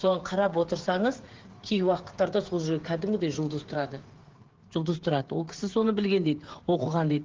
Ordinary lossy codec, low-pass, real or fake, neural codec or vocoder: Opus, 16 kbps; 7.2 kHz; fake; codec, 16 kHz, 8 kbps, FreqCodec, smaller model